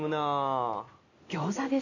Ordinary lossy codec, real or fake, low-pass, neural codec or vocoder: none; real; 7.2 kHz; none